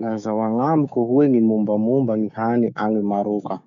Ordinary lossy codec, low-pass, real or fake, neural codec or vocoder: none; 7.2 kHz; fake; codec, 16 kHz, 6 kbps, DAC